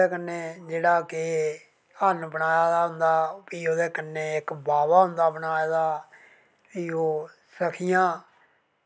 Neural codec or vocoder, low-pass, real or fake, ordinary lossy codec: none; none; real; none